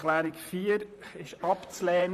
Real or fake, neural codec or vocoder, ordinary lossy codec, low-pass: fake; vocoder, 44.1 kHz, 128 mel bands, Pupu-Vocoder; none; 14.4 kHz